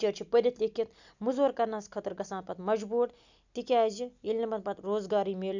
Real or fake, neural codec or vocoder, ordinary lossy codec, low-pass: real; none; none; 7.2 kHz